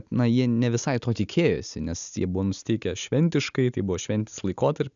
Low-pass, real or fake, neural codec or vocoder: 7.2 kHz; real; none